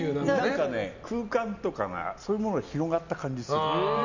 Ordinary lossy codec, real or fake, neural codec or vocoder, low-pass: none; real; none; 7.2 kHz